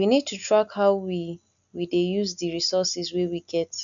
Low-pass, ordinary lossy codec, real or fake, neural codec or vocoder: 7.2 kHz; none; real; none